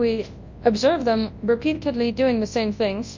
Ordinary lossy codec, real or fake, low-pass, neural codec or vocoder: MP3, 48 kbps; fake; 7.2 kHz; codec, 24 kHz, 0.9 kbps, WavTokenizer, large speech release